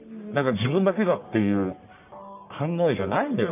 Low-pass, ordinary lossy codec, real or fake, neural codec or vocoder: 3.6 kHz; none; fake; codec, 44.1 kHz, 1.7 kbps, Pupu-Codec